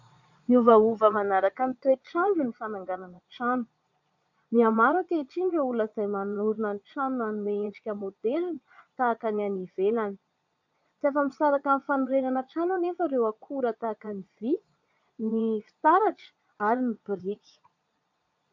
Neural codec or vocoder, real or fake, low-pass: vocoder, 22.05 kHz, 80 mel bands, Vocos; fake; 7.2 kHz